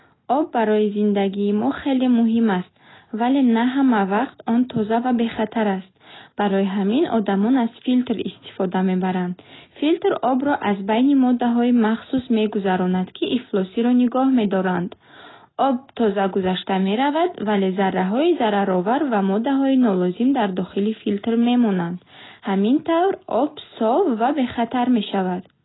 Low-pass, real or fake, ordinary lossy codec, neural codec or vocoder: 7.2 kHz; real; AAC, 16 kbps; none